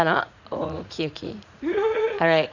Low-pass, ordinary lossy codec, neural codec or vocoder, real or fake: 7.2 kHz; none; vocoder, 44.1 kHz, 80 mel bands, Vocos; fake